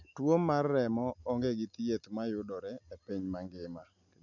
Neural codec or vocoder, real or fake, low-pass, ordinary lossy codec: none; real; 7.2 kHz; none